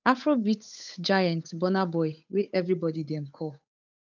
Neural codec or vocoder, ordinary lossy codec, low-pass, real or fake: codec, 16 kHz, 8 kbps, FunCodec, trained on Chinese and English, 25 frames a second; none; 7.2 kHz; fake